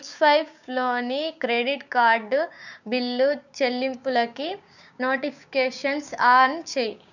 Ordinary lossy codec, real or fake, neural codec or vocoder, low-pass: none; fake; codec, 16 kHz, 6 kbps, DAC; 7.2 kHz